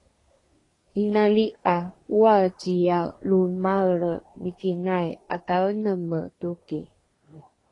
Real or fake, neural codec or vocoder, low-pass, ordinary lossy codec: fake; codec, 24 kHz, 1 kbps, SNAC; 10.8 kHz; AAC, 32 kbps